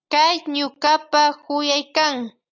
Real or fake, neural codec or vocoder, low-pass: real; none; 7.2 kHz